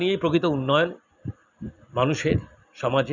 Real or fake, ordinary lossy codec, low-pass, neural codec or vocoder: real; none; 7.2 kHz; none